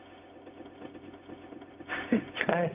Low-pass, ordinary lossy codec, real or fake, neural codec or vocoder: 3.6 kHz; Opus, 32 kbps; fake; codec, 16 kHz, 0.4 kbps, LongCat-Audio-Codec